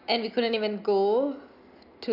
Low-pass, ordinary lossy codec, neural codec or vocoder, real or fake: 5.4 kHz; none; none; real